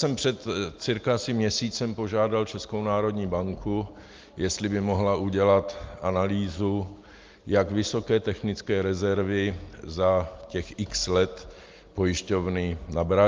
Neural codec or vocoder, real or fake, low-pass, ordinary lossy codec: none; real; 7.2 kHz; Opus, 32 kbps